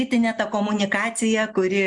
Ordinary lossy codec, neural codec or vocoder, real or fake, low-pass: MP3, 96 kbps; none; real; 10.8 kHz